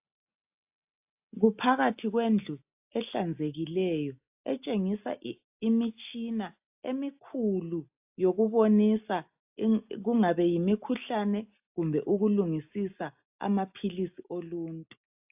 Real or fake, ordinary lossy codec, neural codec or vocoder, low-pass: real; AAC, 32 kbps; none; 3.6 kHz